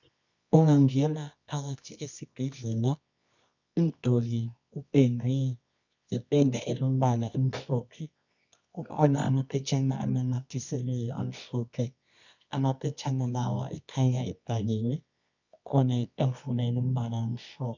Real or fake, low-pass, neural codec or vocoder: fake; 7.2 kHz; codec, 24 kHz, 0.9 kbps, WavTokenizer, medium music audio release